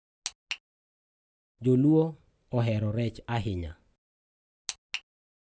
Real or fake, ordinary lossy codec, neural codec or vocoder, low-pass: real; none; none; none